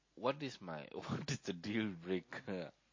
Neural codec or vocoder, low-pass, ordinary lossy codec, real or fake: none; 7.2 kHz; MP3, 32 kbps; real